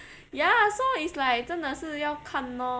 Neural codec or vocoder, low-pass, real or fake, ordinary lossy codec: none; none; real; none